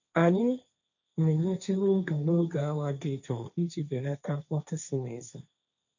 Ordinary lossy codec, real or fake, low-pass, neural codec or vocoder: none; fake; 7.2 kHz; codec, 16 kHz, 1.1 kbps, Voila-Tokenizer